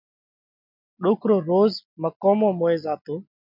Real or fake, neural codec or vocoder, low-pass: real; none; 5.4 kHz